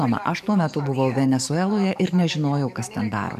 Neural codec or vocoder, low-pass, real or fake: codec, 44.1 kHz, 7.8 kbps, DAC; 14.4 kHz; fake